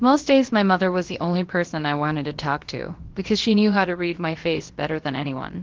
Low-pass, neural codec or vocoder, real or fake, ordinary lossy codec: 7.2 kHz; codec, 16 kHz, about 1 kbps, DyCAST, with the encoder's durations; fake; Opus, 16 kbps